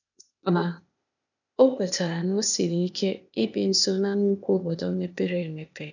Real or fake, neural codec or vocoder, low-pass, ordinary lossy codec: fake; codec, 16 kHz, 0.8 kbps, ZipCodec; 7.2 kHz; none